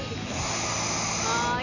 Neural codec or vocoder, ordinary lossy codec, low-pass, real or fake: none; none; 7.2 kHz; real